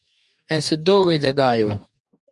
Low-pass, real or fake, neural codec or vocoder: 10.8 kHz; fake; codec, 44.1 kHz, 2.6 kbps, DAC